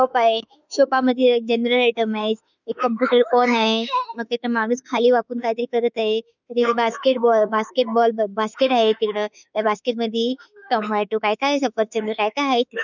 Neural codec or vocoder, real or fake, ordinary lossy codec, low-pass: autoencoder, 48 kHz, 32 numbers a frame, DAC-VAE, trained on Japanese speech; fake; none; 7.2 kHz